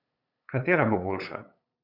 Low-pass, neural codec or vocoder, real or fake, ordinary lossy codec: 5.4 kHz; codec, 16 kHz, 8 kbps, FunCodec, trained on LibriTTS, 25 frames a second; fake; none